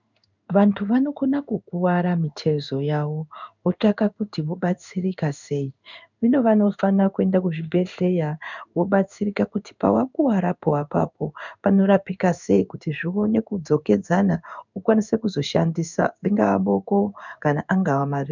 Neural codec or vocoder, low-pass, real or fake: codec, 16 kHz in and 24 kHz out, 1 kbps, XY-Tokenizer; 7.2 kHz; fake